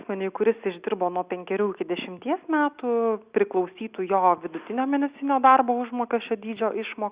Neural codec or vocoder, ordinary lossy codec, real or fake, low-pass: none; Opus, 64 kbps; real; 3.6 kHz